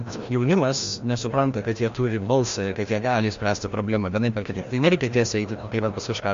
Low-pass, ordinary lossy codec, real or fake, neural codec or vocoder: 7.2 kHz; MP3, 48 kbps; fake; codec, 16 kHz, 1 kbps, FreqCodec, larger model